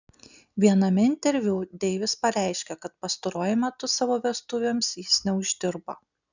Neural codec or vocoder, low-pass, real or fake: none; 7.2 kHz; real